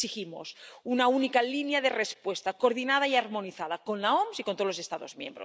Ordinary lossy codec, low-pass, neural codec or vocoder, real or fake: none; none; none; real